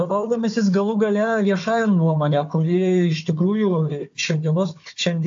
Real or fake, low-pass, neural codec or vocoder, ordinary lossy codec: fake; 7.2 kHz; codec, 16 kHz, 4 kbps, FunCodec, trained on Chinese and English, 50 frames a second; AAC, 64 kbps